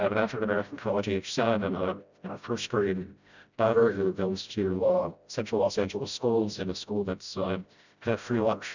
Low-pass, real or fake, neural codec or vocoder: 7.2 kHz; fake; codec, 16 kHz, 0.5 kbps, FreqCodec, smaller model